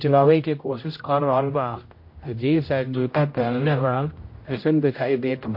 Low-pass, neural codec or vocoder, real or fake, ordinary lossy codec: 5.4 kHz; codec, 16 kHz, 0.5 kbps, X-Codec, HuBERT features, trained on general audio; fake; MP3, 32 kbps